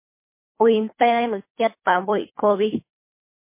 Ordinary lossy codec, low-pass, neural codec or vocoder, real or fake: MP3, 24 kbps; 3.6 kHz; codec, 24 kHz, 3 kbps, HILCodec; fake